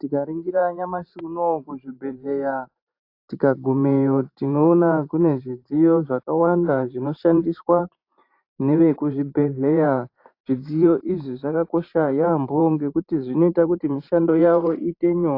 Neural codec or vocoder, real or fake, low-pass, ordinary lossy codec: vocoder, 44.1 kHz, 128 mel bands every 512 samples, BigVGAN v2; fake; 5.4 kHz; AAC, 32 kbps